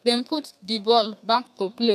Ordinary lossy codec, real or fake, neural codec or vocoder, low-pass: none; fake; codec, 32 kHz, 1.9 kbps, SNAC; 14.4 kHz